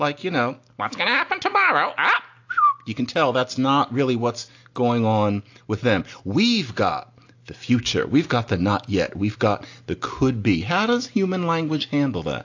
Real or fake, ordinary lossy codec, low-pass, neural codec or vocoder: real; AAC, 48 kbps; 7.2 kHz; none